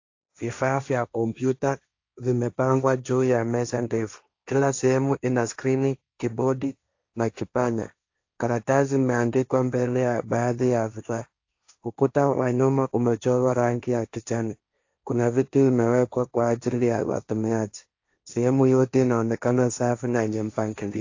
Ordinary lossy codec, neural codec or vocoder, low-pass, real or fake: AAC, 48 kbps; codec, 16 kHz, 1.1 kbps, Voila-Tokenizer; 7.2 kHz; fake